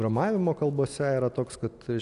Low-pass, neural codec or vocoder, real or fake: 10.8 kHz; none; real